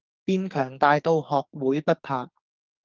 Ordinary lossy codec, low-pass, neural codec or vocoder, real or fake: Opus, 24 kbps; 7.2 kHz; codec, 44.1 kHz, 2.6 kbps, SNAC; fake